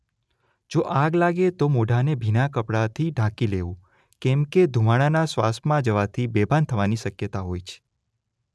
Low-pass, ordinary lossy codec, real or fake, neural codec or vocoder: none; none; real; none